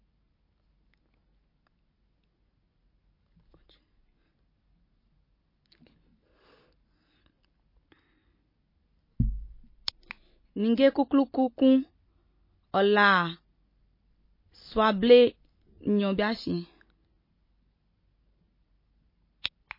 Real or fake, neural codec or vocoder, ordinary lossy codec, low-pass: real; none; MP3, 24 kbps; 5.4 kHz